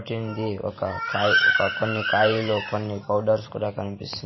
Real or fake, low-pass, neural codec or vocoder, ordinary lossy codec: fake; 7.2 kHz; vocoder, 44.1 kHz, 128 mel bands every 512 samples, BigVGAN v2; MP3, 24 kbps